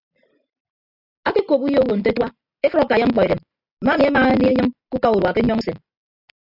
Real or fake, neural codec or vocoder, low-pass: real; none; 5.4 kHz